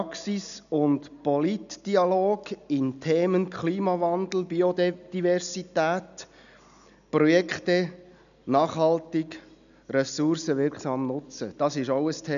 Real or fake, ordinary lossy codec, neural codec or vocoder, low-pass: real; none; none; 7.2 kHz